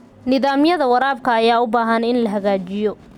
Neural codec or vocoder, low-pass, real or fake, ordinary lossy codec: none; 19.8 kHz; real; none